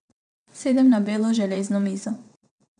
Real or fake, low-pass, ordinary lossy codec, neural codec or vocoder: fake; 9.9 kHz; none; vocoder, 22.05 kHz, 80 mel bands, WaveNeXt